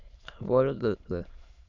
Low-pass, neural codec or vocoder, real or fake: 7.2 kHz; autoencoder, 22.05 kHz, a latent of 192 numbers a frame, VITS, trained on many speakers; fake